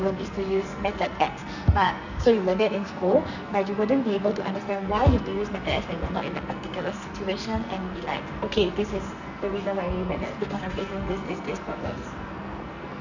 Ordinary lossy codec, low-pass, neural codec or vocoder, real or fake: none; 7.2 kHz; codec, 44.1 kHz, 2.6 kbps, SNAC; fake